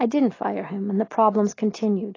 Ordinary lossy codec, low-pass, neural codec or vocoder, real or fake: AAC, 32 kbps; 7.2 kHz; none; real